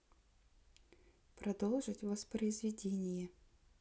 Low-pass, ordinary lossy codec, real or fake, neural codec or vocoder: none; none; real; none